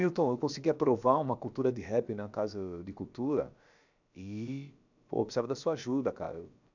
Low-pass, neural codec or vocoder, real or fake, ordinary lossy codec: 7.2 kHz; codec, 16 kHz, about 1 kbps, DyCAST, with the encoder's durations; fake; none